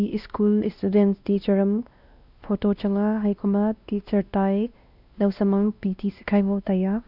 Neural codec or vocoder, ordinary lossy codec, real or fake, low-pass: codec, 16 kHz, 1 kbps, X-Codec, WavLM features, trained on Multilingual LibriSpeech; none; fake; 5.4 kHz